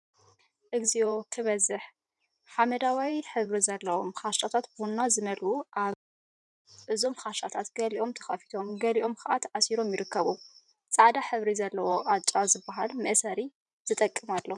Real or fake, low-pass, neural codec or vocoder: fake; 10.8 kHz; vocoder, 44.1 kHz, 128 mel bands every 512 samples, BigVGAN v2